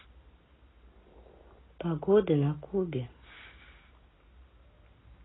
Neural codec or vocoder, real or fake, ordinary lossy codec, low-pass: none; real; AAC, 16 kbps; 7.2 kHz